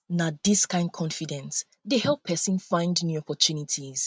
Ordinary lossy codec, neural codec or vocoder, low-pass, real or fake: none; none; none; real